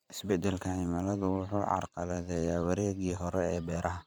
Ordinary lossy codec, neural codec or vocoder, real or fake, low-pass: none; vocoder, 44.1 kHz, 128 mel bands every 512 samples, BigVGAN v2; fake; none